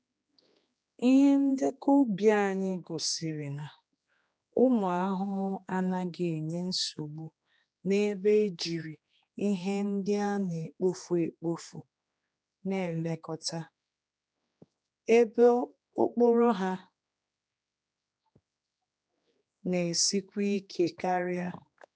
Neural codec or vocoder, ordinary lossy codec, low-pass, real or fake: codec, 16 kHz, 2 kbps, X-Codec, HuBERT features, trained on general audio; none; none; fake